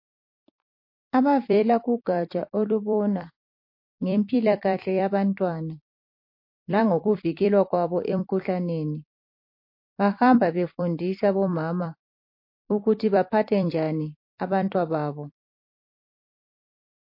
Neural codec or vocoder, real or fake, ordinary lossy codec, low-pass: vocoder, 44.1 kHz, 128 mel bands every 256 samples, BigVGAN v2; fake; MP3, 32 kbps; 5.4 kHz